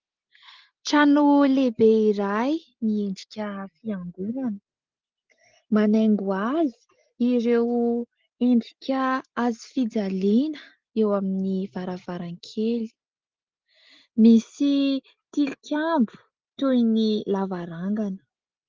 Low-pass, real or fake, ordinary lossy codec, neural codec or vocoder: 7.2 kHz; real; Opus, 16 kbps; none